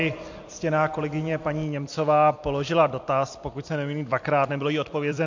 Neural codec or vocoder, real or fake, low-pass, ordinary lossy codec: vocoder, 44.1 kHz, 128 mel bands every 256 samples, BigVGAN v2; fake; 7.2 kHz; MP3, 48 kbps